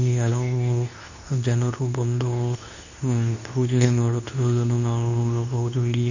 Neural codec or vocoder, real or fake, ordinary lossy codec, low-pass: codec, 24 kHz, 0.9 kbps, WavTokenizer, medium speech release version 2; fake; none; 7.2 kHz